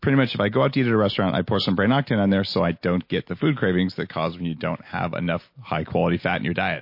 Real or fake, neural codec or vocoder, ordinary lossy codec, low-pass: real; none; MP3, 32 kbps; 5.4 kHz